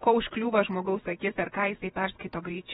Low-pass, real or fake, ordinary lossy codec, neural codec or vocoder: 19.8 kHz; fake; AAC, 16 kbps; vocoder, 48 kHz, 128 mel bands, Vocos